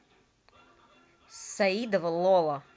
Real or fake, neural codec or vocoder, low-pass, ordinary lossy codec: real; none; none; none